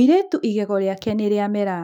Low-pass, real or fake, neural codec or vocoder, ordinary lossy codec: 19.8 kHz; fake; autoencoder, 48 kHz, 128 numbers a frame, DAC-VAE, trained on Japanese speech; none